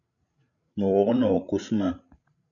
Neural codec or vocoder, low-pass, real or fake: codec, 16 kHz, 16 kbps, FreqCodec, larger model; 7.2 kHz; fake